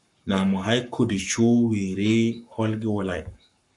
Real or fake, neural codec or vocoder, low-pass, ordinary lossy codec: fake; codec, 44.1 kHz, 7.8 kbps, Pupu-Codec; 10.8 kHz; MP3, 96 kbps